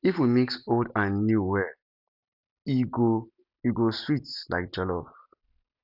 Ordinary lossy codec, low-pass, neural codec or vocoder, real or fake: none; 5.4 kHz; none; real